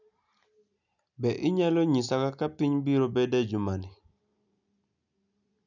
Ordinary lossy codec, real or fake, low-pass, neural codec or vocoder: none; real; 7.2 kHz; none